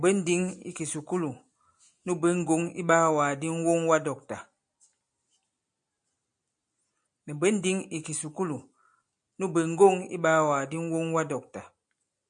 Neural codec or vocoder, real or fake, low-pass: none; real; 9.9 kHz